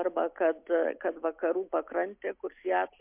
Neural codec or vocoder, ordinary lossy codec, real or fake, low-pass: none; MP3, 32 kbps; real; 3.6 kHz